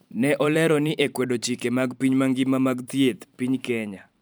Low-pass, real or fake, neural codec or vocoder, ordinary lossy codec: none; fake; vocoder, 44.1 kHz, 128 mel bands every 512 samples, BigVGAN v2; none